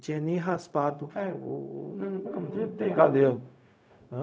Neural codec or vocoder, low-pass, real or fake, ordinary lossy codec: codec, 16 kHz, 0.4 kbps, LongCat-Audio-Codec; none; fake; none